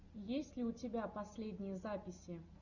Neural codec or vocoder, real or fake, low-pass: none; real; 7.2 kHz